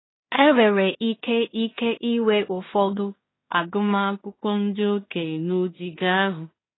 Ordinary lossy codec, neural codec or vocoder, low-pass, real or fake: AAC, 16 kbps; codec, 16 kHz in and 24 kHz out, 0.4 kbps, LongCat-Audio-Codec, two codebook decoder; 7.2 kHz; fake